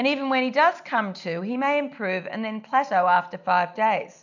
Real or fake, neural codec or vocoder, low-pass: real; none; 7.2 kHz